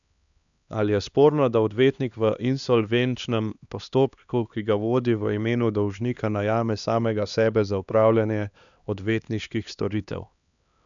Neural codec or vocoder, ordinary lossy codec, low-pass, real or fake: codec, 16 kHz, 2 kbps, X-Codec, HuBERT features, trained on LibriSpeech; none; 7.2 kHz; fake